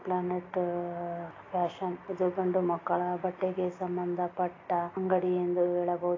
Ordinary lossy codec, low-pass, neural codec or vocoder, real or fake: AAC, 32 kbps; 7.2 kHz; none; real